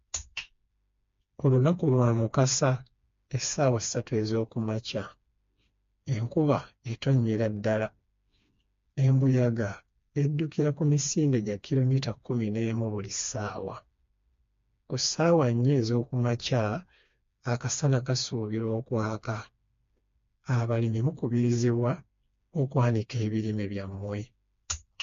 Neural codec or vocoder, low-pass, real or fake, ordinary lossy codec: codec, 16 kHz, 2 kbps, FreqCodec, smaller model; 7.2 kHz; fake; MP3, 48 kbps